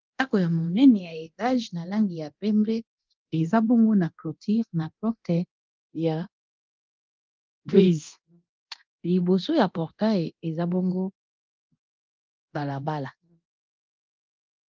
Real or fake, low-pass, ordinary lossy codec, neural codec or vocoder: fake; 7.2 kHz; Opus, 32 kbps; codec, 24 kHz, 0.9 kbps, DualCodec